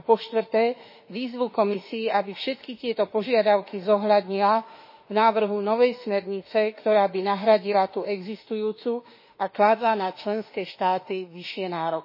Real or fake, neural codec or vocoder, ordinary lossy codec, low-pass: fake; autoencoder, 48 kHz, 32 numbers a frame, DAC-VAE, trained on Japanese speech; MP3, 24 kbps; 5.4 kHz